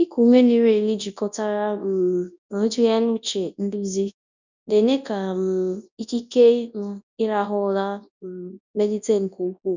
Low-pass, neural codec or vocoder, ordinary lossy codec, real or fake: 7.2 kHz; codec, 24 kHz, 0.9 kbps, WavTokenizer, large speech release; none; fake